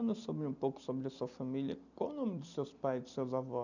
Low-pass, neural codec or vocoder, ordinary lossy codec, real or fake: 7.2 kHz; none; none; real